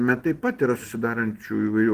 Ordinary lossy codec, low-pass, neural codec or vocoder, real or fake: Opus, 16 kbps; 14.4 kHz; none; real